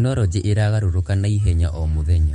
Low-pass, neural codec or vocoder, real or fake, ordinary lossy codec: 19.8 kHz; autoencoder, 48 kHz, 128 numbers a frame, DAC-VAE, trained on Japanese speech; fake; MP3, 48 kbps